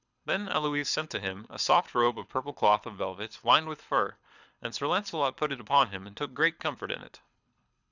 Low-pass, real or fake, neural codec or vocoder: 7.2 kHz; fake; codec, 24 kHz, 6 kbps, HILCodec